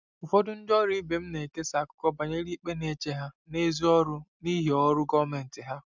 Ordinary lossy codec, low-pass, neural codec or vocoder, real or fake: none; 7.2 kHz; none; real